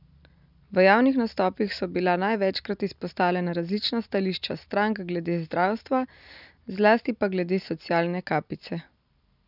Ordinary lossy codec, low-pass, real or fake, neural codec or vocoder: none; 5.4 kHz; real; none